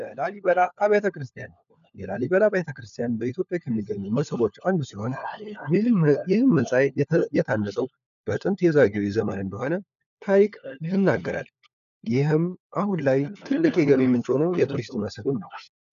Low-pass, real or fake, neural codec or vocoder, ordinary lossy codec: 7.2 kHz; fake; codec, 16 kHz, 4 kbps, FunCodec, trained on LibriTTS, 50 frames a second; MP3, 96 kbps